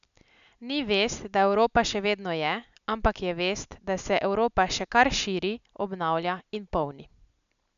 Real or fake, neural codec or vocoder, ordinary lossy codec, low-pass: real; none; none; 7.2 kHz